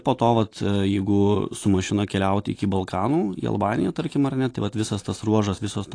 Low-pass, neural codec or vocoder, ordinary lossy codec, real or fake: 9.9 kHz; none; AAC, 48 kbps; real